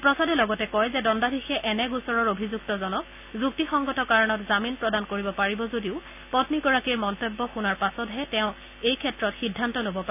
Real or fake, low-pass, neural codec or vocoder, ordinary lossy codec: real; 3.6 kHz; none; none